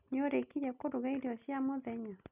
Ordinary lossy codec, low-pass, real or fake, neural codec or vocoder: none; 3.6 kHz; real; none